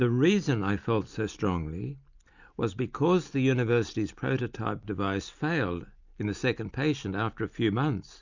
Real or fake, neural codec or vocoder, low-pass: real; none; 7.2 kHz